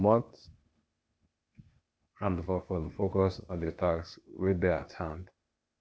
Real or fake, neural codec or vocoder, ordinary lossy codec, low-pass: fake; codec, 16 kHz, 0.8 kbps, ZipCodec; none; none